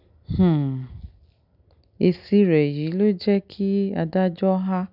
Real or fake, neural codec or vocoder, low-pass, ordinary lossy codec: real; none; 5.4 kHz; none